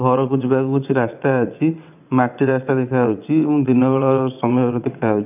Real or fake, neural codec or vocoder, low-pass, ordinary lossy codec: fake; vocoder, 22.05 kHz, 80 mel bands, WaveNeXt; 3.6 kHz; none